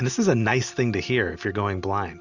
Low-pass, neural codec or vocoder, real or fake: 7.2 kHz; none; real